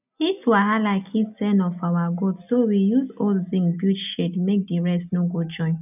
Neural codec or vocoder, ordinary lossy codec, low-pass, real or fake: none; none; 3.6 kHz; real